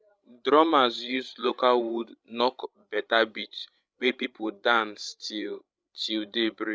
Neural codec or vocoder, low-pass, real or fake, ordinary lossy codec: codec, 16 kHz, 16 kbps, FreqCodec, larger model; none; fake; none